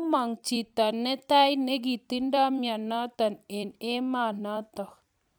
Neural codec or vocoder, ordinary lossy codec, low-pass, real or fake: vocoder, 44.1 kHz, 128 mel bands every 256 samples, BigVGAN v2; none; none; fake